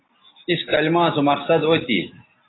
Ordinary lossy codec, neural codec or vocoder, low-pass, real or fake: AAC, 16 kbps; none; 7.2 kHz; real